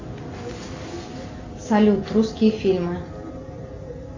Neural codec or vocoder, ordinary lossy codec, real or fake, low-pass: none; AAC, 48 kbps; real; 7.2 kHz